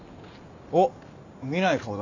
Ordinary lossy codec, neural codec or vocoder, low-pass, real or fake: none; none; 7.2 kHz; real